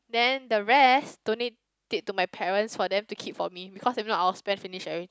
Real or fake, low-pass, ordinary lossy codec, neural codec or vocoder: real; none; none; none